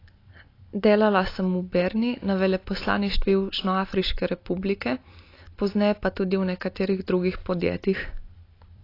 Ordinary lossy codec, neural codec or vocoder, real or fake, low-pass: AAC, 32 kbps; none; real; 5.4 kHz